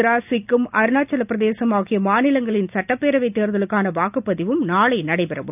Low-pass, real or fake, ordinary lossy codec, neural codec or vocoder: 3.6 kHz; real; none; none